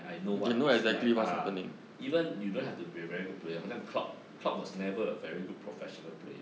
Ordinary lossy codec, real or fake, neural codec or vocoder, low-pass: none; real; none; none